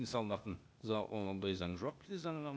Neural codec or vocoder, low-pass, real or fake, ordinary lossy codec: codec, 16 kHz, 0.8 kbps, ZipCodec; none; fake; none